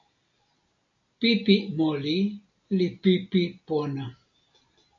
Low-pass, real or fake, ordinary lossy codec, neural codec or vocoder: 7.2 kHz; real; AAC, 64 kbps; none